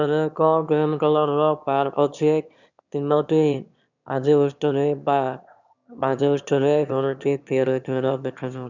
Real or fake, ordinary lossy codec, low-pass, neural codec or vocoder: fake; none; 7.2 kHz; autoencoder, 22.05 kHz, a latent of 192 numbers a frame, VITS, trained on one speaker